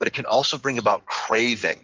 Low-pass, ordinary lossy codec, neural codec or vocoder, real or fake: 7.2 kHz; Opus, 24 kbps; codec, 16 kHz in and 24 kHz out, 2.2 kbps, FireRedTTS-2 codec; fake